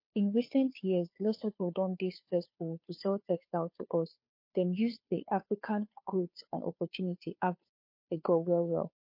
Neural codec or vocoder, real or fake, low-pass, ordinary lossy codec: codec, 16 kHz, 2 kbps, FunCodec, trained on Chinese and English, 25 frames a second; fake; 5.4 kHz; MP3, 32 kbps